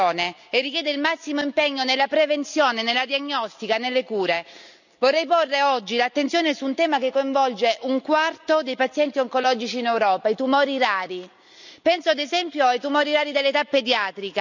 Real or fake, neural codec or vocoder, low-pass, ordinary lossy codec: real; none; 7.2 kHz; none